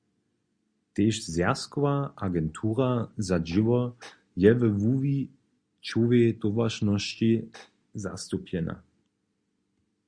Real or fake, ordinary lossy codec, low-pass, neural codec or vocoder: real; Opus, 64 kbps; 9.9 kHz; none